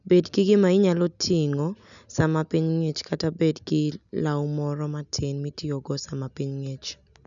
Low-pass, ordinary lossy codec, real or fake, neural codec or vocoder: 7.2 kHz; none; real; none